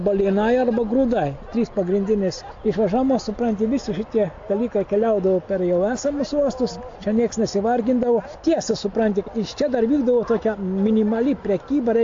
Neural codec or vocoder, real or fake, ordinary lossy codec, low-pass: none; real; AAC, 48 kbps; 7.2 kHz